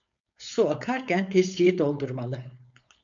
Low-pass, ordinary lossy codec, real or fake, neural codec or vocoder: 7.2 kHz; MP3, 64 kbps; fake; codec, 16 kHz, 4.8 kbps, FACodec